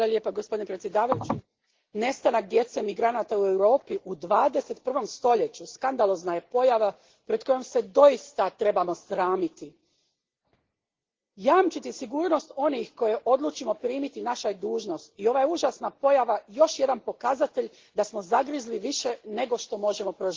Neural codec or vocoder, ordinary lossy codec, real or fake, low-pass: none; Opus, 16 kbps; real; 7.2 kHz